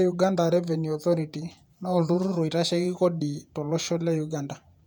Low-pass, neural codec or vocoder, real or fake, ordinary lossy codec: 19.8 kHz; vocoder, 48 kHz, 128 mel bands, Vocos; fake; none